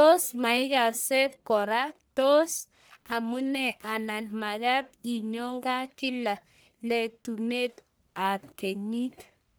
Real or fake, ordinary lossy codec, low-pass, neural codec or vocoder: fake; none; none; codec, 44.1 kHz, 1.7 kbps, Pupu-Codec